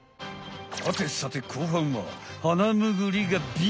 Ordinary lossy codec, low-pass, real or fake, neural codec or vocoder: none; none; real; none